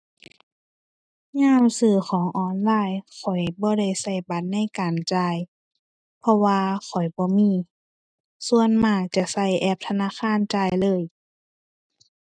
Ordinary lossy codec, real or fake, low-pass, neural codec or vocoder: none; real; 9.9 kHz; none